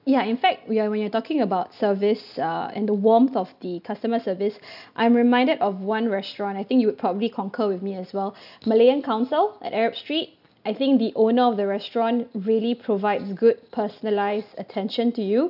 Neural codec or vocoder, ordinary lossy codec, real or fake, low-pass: none; none; real; 5.4 kHz